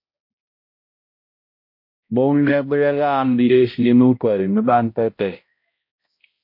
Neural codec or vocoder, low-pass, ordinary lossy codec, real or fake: codec, 16 kHz, 0.5 kbps, X-Codec, HuBERT features, trained on balanced general audio; 5.4 kHz; MP3, 32 kbps; fake